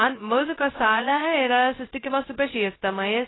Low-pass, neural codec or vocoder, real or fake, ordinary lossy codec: 7.2 kHz; codec, 16 kHz, 0.2 kbps, FocalCodec; fake; AAC, 16 kbps